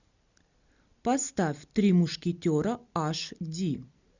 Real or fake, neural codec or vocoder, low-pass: real; none; 7.2 kHz